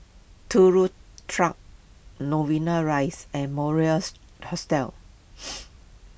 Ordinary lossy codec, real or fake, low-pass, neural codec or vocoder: none; real; none; none